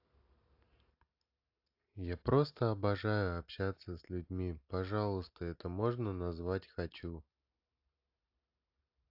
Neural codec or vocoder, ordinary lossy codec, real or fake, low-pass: none; AAC, 48 kbps; real; 5.4 kHz